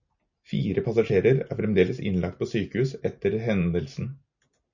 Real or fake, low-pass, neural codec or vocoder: real; 7.2 kHz; none